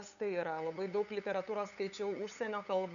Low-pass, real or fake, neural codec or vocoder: 7.2 kHz; fake; codec, 16 kHz, 8 kbps, FunCodec, trained on LibriTTS, 25 frames a second